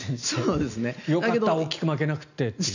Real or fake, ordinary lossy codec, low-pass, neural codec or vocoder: real; none; 7.2 kHz; none